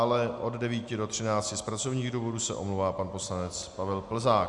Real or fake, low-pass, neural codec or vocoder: real; 10.8 kHz; none